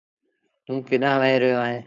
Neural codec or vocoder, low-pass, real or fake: codec, 16 kHz, 4.8 kbps, FACodec; 7.2 kHz; fake